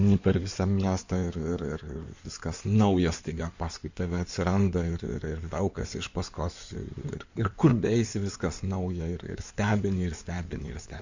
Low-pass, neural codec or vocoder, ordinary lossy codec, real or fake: 7.2 kHz; codec, 16 kHz in and 24 kHz out, 2.2 kbps, FireRedTTS-2 codec; Opus, 64 kbps; fake